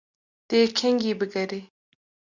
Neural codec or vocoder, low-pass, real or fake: none; 7.2 kHz; real